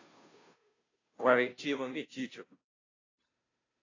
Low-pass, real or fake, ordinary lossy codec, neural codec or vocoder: 7.2 kHz; fake; AAC, 32 kbps; codec, 16 kHz, 0.5 kbps, FunCodec, trained on Chinese and English, 25 frames a second